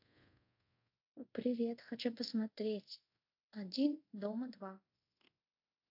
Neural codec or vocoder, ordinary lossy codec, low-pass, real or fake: codec, 24 kHz, 0.5 kbps, DualCodec; AAC, 32 kbps; 5.4 kHz; fake